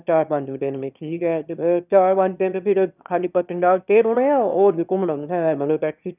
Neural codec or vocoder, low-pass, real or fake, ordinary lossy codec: autoencoder, 22.05 kHz, a latent of 192 numbers a frame, VITS, trained on one speaker; 3.6 kHz; fake; none